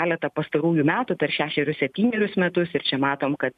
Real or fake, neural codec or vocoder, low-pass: real; none; 14.4 kHz